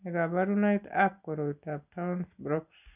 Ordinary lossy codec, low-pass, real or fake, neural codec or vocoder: none; 3.6 kHz; real; none